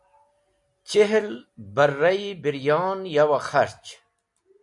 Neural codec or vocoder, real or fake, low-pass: none; real; 10.8 kHz